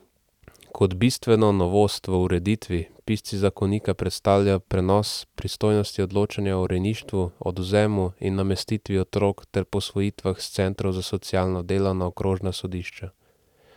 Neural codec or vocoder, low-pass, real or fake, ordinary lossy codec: none; 19.8 kHz; real; none